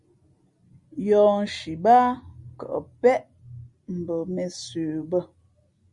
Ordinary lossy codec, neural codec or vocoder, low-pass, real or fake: Opus, 64 kbps; none; 10.8 kHz; real